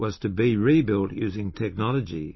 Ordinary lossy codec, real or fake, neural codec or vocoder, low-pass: MP3, 24 kbps; fake; vocoder, 44.1 kHz, 128 mel bands every 256 samples, BigVGAN v2; 7.2 kHz